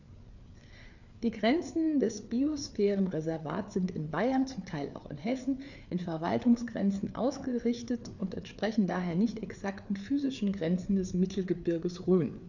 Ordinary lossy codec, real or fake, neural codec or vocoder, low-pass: none; fake; codec, 16 kHz, 4 kbps, FreqCodec, larger model; 7.2 kHz